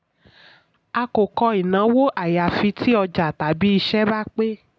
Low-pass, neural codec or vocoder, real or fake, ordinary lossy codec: none; none; real; none